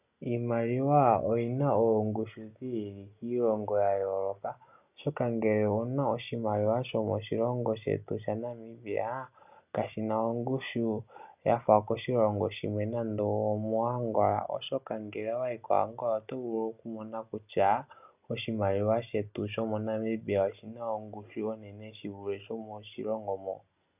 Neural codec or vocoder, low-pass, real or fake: none; 3.6 kHz; real